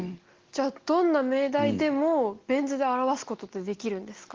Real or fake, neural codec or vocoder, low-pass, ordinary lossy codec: real; none; 7.2 kHz; Opus, 16 kbps